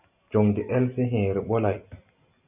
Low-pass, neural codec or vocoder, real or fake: 3.6 kHz; none; real